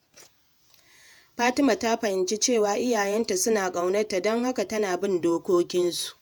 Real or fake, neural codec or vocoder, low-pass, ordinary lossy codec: fake; vocoder, 48 kHz, 128 mel bands, Vocos; none; none